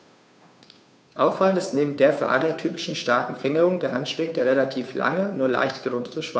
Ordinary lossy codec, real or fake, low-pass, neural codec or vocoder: none; fake; none; codec, 16 kHz, 2 kbps, FunCodec, trained on Chinese and English, 25 frames a second